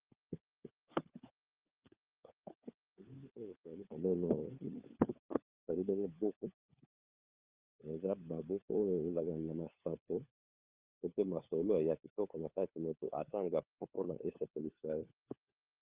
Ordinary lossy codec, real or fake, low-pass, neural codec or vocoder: Opus, 32 kbps; fake; 3.6 kHz; codec, 16 kHz, 4 kbps, FunCodec, trained on LibriTTS, 50 frames a second